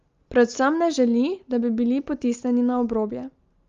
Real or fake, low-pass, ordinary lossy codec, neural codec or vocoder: real; 7.2 kHz; Opus, 32 kbps; none